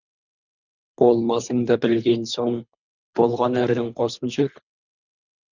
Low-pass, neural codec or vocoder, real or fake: 7.2 kHz; codec, 24 kHz, 3 kbps, HILCodec; fake